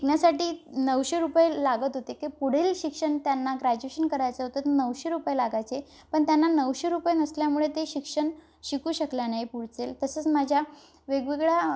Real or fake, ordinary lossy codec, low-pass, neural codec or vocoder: real; none; none; none